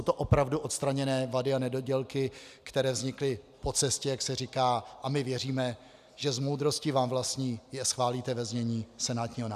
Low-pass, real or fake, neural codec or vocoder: 14.4 kHz; real; none